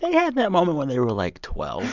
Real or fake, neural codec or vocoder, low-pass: real; none; 7.2 kHz